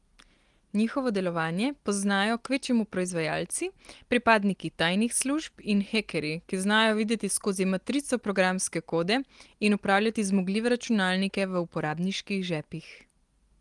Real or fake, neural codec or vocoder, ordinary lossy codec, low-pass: real; none; Opus, 24 kbps; 10.8 kHz